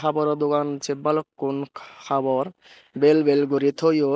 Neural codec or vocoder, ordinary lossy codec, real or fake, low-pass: none; none; real; none